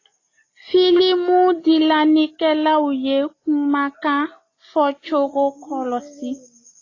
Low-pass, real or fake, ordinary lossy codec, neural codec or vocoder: 7.2 kHz; real; AAC, 32 kbps; none